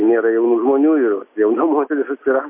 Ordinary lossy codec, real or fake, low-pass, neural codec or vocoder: MP3, 24 kbps; real; 3.6 kHz; none